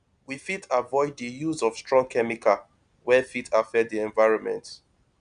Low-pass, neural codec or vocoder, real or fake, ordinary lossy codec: 9.9 kHz; none; real; none